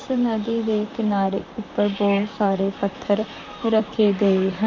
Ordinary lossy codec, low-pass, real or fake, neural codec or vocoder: MP3, 48 kbps; 7.2 kHz; fake; vocoder, 44.1 kHz, 128 mel bands, Pupu-Vocoder